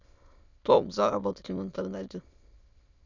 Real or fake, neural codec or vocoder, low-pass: fake; autoencoder, 22.05 kHz, a latent of 192 numbers a frame, VITS, trained on many speakers; 7.2 kHz